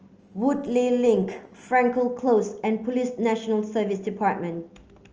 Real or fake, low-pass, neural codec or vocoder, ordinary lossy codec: real; 7.2 kHz; none; Opus, 24 kbps